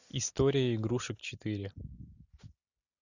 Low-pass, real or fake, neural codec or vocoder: 7.2 kHz; real; none